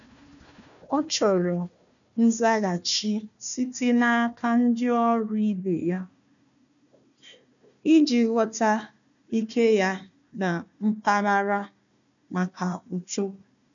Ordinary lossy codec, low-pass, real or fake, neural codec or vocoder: none; 7.2 kHz; fake; codec, 16 kHz, 1 kbps, FunCodec, trained on Chinese and English, 50 frames a second